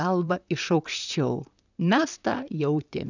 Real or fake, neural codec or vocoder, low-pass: fake; vocoder, 44.1 kHz, 128 mel bands, Pupu-Vocoder; 7.2 kHz